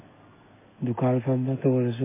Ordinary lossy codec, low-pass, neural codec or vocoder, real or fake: MP3, 16 kbps; 3.6 kHz; none; real